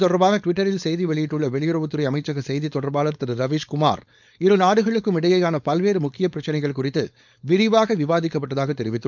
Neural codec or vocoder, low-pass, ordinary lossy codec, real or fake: codec, 16 kHz, 4.8 kbps, FACodec; 7.2 kHz; none; fake